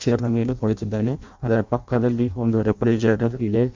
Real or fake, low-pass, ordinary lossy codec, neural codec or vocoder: fake; 7.2 kHz; MP3, 48 kbps; codec, 16 kHz in and 24 kHz out, 0.6 kbps, FireRedTTS-2 codec